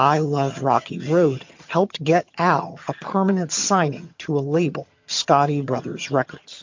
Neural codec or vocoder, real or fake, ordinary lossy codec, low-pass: vocoder, 22.05 kHz, 80 mel bands, HiFi-GAN; fake; MP3, 48 kbps; 7.2 kHz